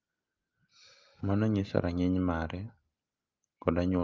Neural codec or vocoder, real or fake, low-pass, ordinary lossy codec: none; real; 7.2 kHz; Opus, 24 kbps